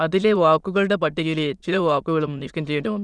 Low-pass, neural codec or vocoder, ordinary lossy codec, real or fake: none; autoencoder, 22.05 kHz, a latent of 192 numbers a frame, VITS, trained on many speakers; none; fake